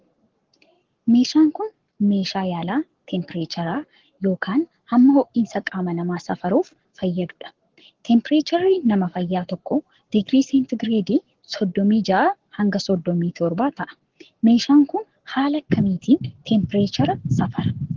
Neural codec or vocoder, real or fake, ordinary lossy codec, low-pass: codec, 44.1 kHz, 7.8 kbps, Pupu-Codec; fake; Opus, 16 kbps; 7.2 kHz